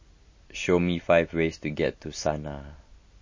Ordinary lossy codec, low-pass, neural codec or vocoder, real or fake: MP3, 32 kbps; 7.2 kHz; none; real